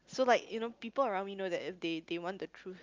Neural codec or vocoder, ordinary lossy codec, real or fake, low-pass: none; Opus, 24 kbps; real; 7.2 kHz